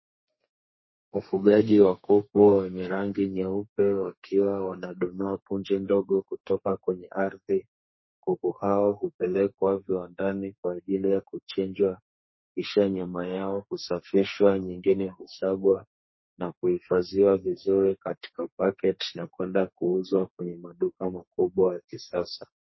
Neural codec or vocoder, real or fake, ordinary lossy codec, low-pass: codec, 32 kHz, 1.9 kbps, SNAC; fake; MP3, 24 kbps; 7.2 kHz